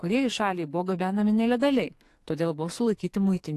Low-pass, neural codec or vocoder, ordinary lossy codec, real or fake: 14.4 kHz; codec, 44.1 kHz, 2.6 kbps, SNAC; AAC, 64 kbps; fake